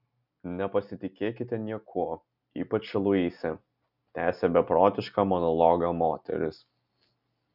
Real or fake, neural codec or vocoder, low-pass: real; none; 5.4 kHz